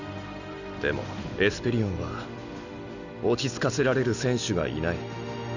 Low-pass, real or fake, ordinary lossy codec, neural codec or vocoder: 7.2 kHz; real; none; none